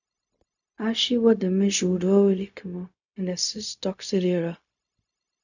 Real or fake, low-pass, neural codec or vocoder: fake; 7.2 kHz; codec, 16 kHz, 0.4 kbps, LongCat-Audio-Codec